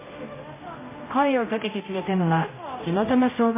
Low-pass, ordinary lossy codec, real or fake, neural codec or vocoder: 3.6 kHz; MP3, 16 kbps; fake; codec, 16 kHz, 0.5 kbps, X-Codec, HuBERT features, trained on general audio